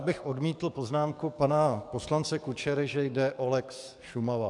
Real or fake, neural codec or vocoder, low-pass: fake; codec, 44.1 kHz, 7.8 kbps, DAC; 10.8 kHz